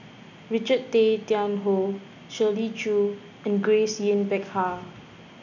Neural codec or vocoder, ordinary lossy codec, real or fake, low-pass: none; none; real; 7.2 kHz